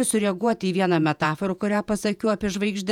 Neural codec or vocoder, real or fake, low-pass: vocoder, 48 kHz, 128 mel bands, Vocos; fake; 19.8 kHz